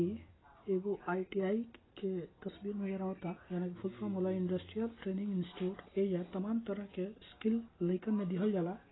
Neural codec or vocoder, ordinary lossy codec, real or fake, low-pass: none; AAC, 16 kbps; real; 7.2 kHz